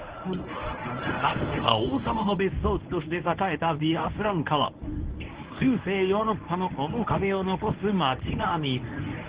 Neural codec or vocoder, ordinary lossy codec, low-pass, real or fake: codec, 24 kHz, 0.9 kbps, WavTokenizer, medium speech release version 1; Opus, 16 kbps; 3.6 kHz; fake